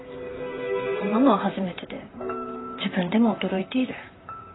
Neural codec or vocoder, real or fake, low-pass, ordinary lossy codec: vocoder, 44.1 kHz, 128 mel bands, Pupu-Vocoder; fake; 7.2 kHz; AAC, 16 kbps